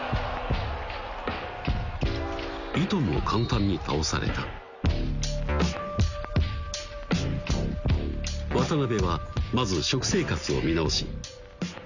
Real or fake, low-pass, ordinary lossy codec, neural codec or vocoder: real; 7.2 kHz; none; none